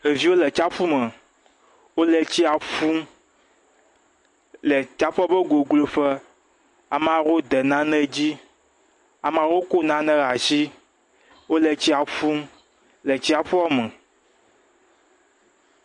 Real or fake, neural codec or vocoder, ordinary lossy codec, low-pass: real; none; MP3, 48 kbps; 10.8 kHz